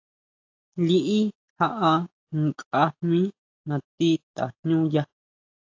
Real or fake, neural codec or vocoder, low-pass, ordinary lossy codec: real; none; 7.2 kHz; AAC, 48 kbps